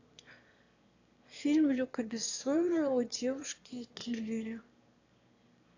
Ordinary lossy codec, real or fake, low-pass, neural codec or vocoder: AAC, 48 kbps; fake; 7.2 kHz; autoencoder, 22.05 kHz, a latent of 192 numbers a frame, VITS, trained on one speaker